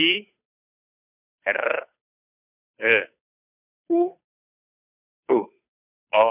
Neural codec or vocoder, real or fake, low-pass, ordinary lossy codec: codec, 16 kHz, 2 kbps, FunCodec, trained on Chinese and English, 25 frames a second; fake; 3.6 kHz; none